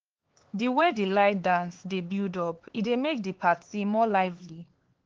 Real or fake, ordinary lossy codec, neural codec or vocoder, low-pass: fake; Opus, 16 kbps; codec, 16 kHz, 4 kbps, X-Codec, HuBERT features, trained on LibriSpeech; 7.2 kHz